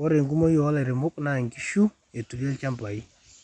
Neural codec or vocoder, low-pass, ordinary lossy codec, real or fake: none; 10.8 kHz; none; real